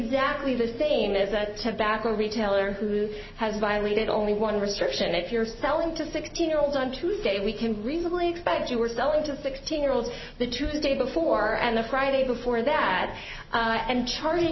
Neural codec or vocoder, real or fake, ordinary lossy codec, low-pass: none; real; MP3, 24 kbps; 7.2 kHz